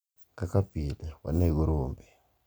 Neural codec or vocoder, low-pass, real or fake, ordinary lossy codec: none; none; real; none